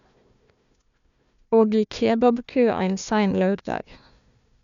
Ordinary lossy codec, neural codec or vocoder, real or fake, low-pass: none; codec, 16 kHz, 1 kbps, FunCodec, trained on Chinese and English, 50 frames a second; fake; 7.2 kHz